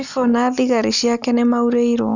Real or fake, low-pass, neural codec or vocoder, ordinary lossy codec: real; 7.2 kHz; none; none